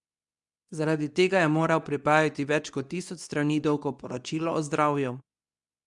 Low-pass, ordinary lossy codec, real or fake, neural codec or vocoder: 10.8 kHz; none; fake; codec, 24 kHz, 0.9 kbps, WavTokenizer, medium speech release version 2